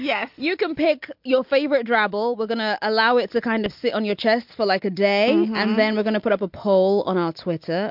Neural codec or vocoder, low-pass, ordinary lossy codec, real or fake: none; 5.4 kHz; MP3, 48 kbps; real